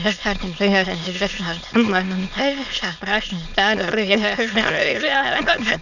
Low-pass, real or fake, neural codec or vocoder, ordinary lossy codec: 7.2 kHz; fake; autoencoder, 22.05 kHz, a latent of 192 numbers a frame, VITS, trained on many speakers; none